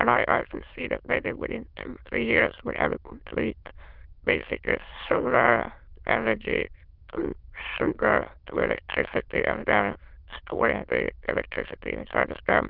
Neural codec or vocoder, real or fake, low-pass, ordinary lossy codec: autoencoder, 22.05 kHz, a latent of 192 numbers a frame, VITS, trained on many speakers; fake; 5.4 kHz; Opus, 32 kbps